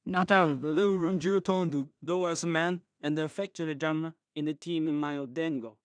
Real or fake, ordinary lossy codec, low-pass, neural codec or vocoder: fake; none; 9.9 kHz; codec, 16 kHz in and 24 kHz out, 0.4 kbps, LongCat-Audio-Codec, two codebook decoder